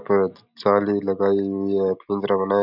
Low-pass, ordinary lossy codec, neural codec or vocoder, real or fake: 5.4 kHz; none; none; real